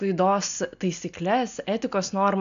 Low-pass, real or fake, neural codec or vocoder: 7.2 kHz; real; none